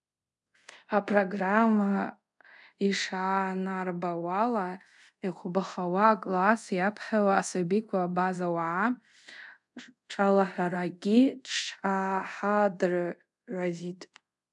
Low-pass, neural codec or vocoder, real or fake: 10.8 kHz; codec, 24 kHz, 0.5 kbps, DualCodec; fake